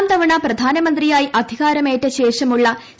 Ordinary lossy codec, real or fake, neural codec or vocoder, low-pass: none; real; none; none